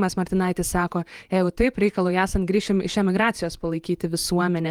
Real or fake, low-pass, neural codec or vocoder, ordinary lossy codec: fake; 19.8 kHz; vocoder, 48 kHz, 128 mel bands, Vocos; Opus, 32 kbps